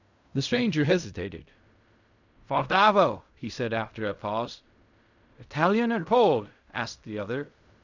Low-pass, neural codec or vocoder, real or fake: 7.2 kHz; codec, 16 kHz in and 24 kHz out, 0.4 kbps, LongCat-Audio-Codec, fine tuned four codebook decoder; fake